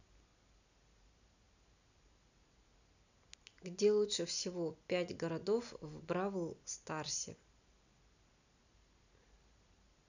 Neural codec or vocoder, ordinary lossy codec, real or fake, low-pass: none; none; real; 7.2 kHz